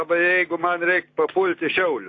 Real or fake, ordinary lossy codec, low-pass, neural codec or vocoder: real; AAC, 32 kbps; 7.2 kHz; none